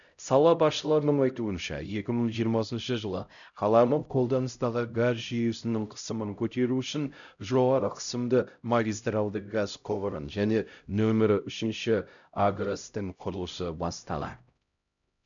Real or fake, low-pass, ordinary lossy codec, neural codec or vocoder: fake; 7.2 kHz; none; codec, 16 kHz, 0.5 kbps, X-Codec, HuBERT features, trained on LibriSpeech